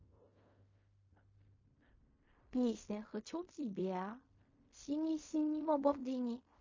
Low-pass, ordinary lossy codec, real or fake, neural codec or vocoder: 7.2 kHz; MP3, 32 kbps; fake; codec, 16 kHz in and 24 kHz out, 0.4 kbps, LongCat-Audio-Codec, fine tuned four codebook decoder